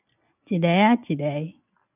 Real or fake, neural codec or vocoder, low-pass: real; none; 3.6 kHz